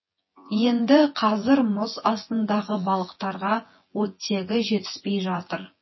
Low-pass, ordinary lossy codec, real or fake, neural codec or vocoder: 7.2 kHz; MP3, 24 kbps; fake; vocoder, 24 kHz, 100 mel bands, Vocos